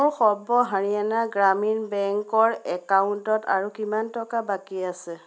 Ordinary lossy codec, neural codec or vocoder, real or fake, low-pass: none; none; real; none